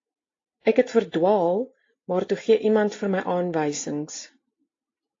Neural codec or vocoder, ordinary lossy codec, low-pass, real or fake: none; AAC, 32 kbps; 7.2 kHz; real